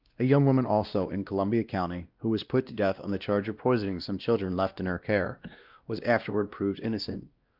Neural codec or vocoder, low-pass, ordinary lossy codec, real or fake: codec, 16 kHz, 1 kbps, X-Codec, WavLM features, trained on Multilingual LibriSpeech; 5.4 kHz; Opus, 24 kbps; fake